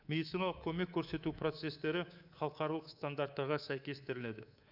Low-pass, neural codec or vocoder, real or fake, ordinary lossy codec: 5.4 kHz; codec, 24 kHz, 3.1 kbps, DualCodec; fake; none